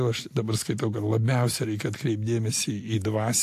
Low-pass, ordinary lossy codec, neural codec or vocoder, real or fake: 14.4 kHz; AAC, 64 kbps; none; real